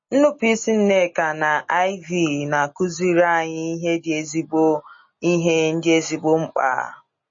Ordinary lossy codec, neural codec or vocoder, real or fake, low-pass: MP3, 32 kbps; none; real; 7.2 kHz